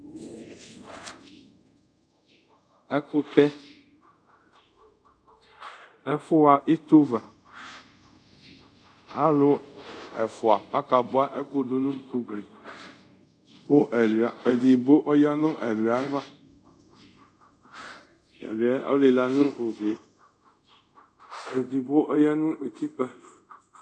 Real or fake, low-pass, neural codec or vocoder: fake; 9.9 kHz; codec, 24 kHz, 0.5 kbps, DualCodec